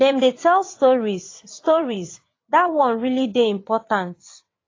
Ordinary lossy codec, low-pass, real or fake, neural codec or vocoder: AAC, 32 kbps; 7.2 kHz; fake; vocoder, 22.05 kHz, 80 mel bands, WaveNeXt